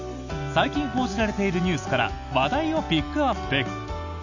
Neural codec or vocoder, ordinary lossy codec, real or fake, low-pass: none; none; real; 7.2 kHz